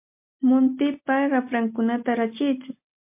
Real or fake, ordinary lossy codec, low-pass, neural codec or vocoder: real; MP3, 32 kbps; 3.6 kHz; none